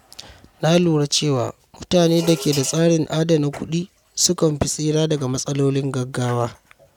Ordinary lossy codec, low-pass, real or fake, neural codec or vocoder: none; 19.8 kHz; real; none